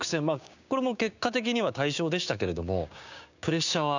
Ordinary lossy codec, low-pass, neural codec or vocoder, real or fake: none; 7.2 kHz; codec, 16 kHz, 6 kbps, DAC; fake